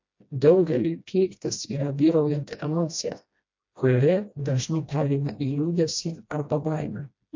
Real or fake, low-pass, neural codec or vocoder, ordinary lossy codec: fake; 7.2 kHz; codec, 16 kHz, 1 kbps, FreqCodec, smaller model; MP3, 48 kbps